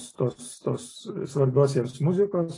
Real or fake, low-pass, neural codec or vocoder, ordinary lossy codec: real; 10.8 kHz; none; AAC, 32 kbps